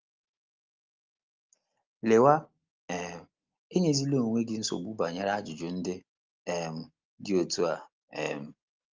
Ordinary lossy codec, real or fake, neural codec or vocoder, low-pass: Opus, 32 kbps; real; none; 7.2 kHz